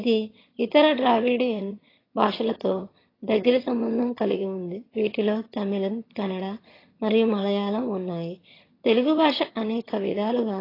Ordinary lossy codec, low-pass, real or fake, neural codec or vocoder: AAC, 24 kbps; 5.4 kHz; fake; vocoder, 22.05 kHz, 80 mel bands, HiFi-GAN